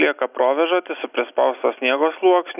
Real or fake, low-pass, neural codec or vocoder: real; 3.6 kHz; none